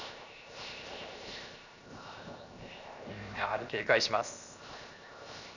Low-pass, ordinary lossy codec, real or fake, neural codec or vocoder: 7.2 kHz; none; fake; codec, 16 kHz, 0.7 kbps, FocalCodec